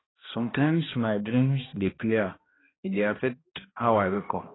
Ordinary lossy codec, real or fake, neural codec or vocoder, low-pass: AAC, 16 kbps; fake; codec, 16 kHz, 1 kbps, X-Codec, HuBERT features, trained on balanced general audio; 7.2 kHz